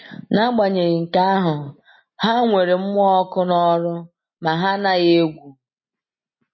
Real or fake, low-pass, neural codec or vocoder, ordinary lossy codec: real; 7.2 kHz; none; MP3, 24 kbps